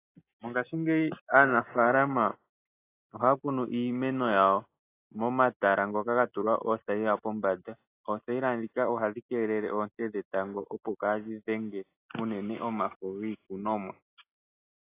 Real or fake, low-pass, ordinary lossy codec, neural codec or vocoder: real; 3.6 kHz; AAC, 24 kbps; none